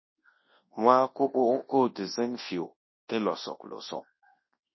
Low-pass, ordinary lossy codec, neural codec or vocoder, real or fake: 7.2 kHz; MP3, 24 kbps; codec, 24 kHz, 0.9 kbps, WavTokenizer, large speech release; fake